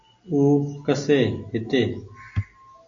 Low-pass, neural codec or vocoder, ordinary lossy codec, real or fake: 7.2 kHz; none; AAC, 64 kbps; real